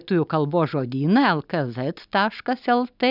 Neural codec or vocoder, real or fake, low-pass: none; real; 5.4 kHz